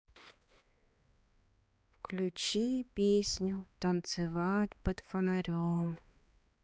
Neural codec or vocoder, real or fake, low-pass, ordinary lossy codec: codec, 16 kHz, 2 kbps, X-Codec, HuBERT features, trained on balanced general audio; fake; none; none